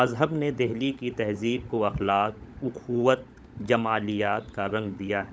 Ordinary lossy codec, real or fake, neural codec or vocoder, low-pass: none; fake; codec, 16 kHz, 16 kbps, FunCodec, trained on LibriTTS, 50 frames a second; none